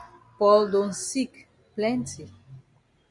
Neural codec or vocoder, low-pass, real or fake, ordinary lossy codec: none; 10.8 kHz; real; Opus, 64 kbps